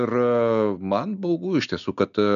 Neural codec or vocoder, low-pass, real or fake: none; 7.2 kHz; real